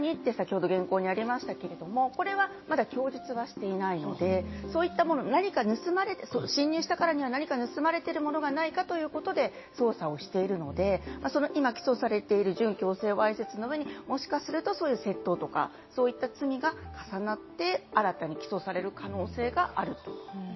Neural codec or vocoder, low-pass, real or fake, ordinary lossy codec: none; 7.2 kHz; real; MP3, 24 kbps